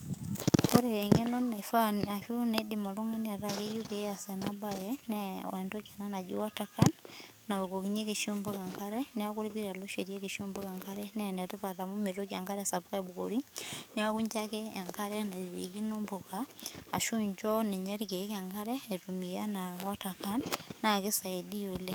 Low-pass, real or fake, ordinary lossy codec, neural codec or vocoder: none; fake; none; codec, 44.1 kHz, 7.8 kbps, DAC